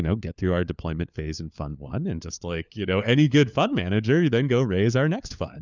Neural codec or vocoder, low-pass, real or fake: codec, 16 kHz, 4 kbps, FunCodec, trained on LibriTTS, 50 frames a second; 7.2 kHz; fake